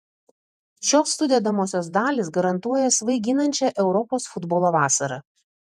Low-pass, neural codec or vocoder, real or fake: 14.4 kHz; vocoder, 48 kHz, 128 mel bands, Vocos; fake